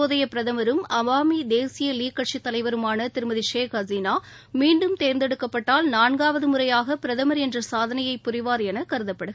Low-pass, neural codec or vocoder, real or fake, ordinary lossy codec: none; none; real; none